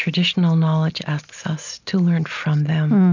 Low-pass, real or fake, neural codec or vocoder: 7.2 kHz; real; none